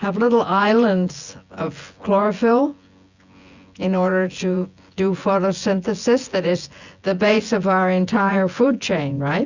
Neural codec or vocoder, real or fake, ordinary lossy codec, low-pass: vocoder, 24 kHz, 100 mel bands, Vocos; fake; Opus, 64 kbps; 7.2 kHz